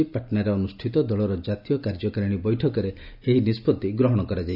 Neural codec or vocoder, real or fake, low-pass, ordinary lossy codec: none; real; 5.4 kHz; none